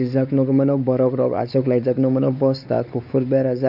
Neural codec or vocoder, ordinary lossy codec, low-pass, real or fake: codec, 16 kHz, 4 kbps, X-Codec, WavLM features, trained on Multilingual LibriSpeech; none; 5.4 kHz; fake